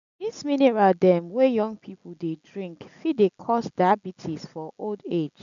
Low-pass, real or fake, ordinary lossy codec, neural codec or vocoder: 7.2 kHz; real; AAC, 96 kbps; none